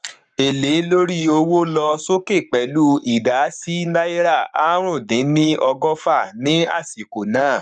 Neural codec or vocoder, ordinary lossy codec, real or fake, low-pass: codec, 44.1 kHz, 7.8 kbps, Pupu-Codec; none; fake; 9.9 kHz